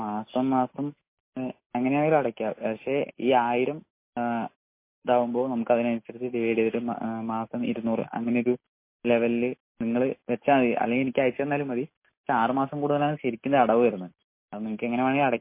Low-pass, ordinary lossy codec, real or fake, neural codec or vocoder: 3.6 kHz; MP3, 24 kbps; real; none